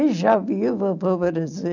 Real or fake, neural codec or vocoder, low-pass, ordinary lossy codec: real; none; 7.2 kHz; none